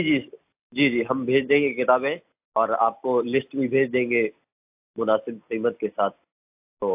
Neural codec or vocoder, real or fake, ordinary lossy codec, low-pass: none; real; AAC, 32 kbps; 3.6 kHz